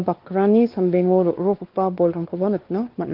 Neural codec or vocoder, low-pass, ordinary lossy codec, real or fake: codec, 16 kHz, 2 kbps, X-Codec, WavLM features, trained on Multilingual LibriSpeech; 5.4 kHz; Opus, 16 kbps; fake